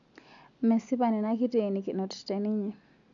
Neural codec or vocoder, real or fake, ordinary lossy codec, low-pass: none; real; none; 7.2 kHz